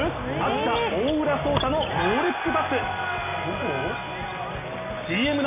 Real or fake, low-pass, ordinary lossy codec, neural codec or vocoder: real; 3.6 kHz; none; none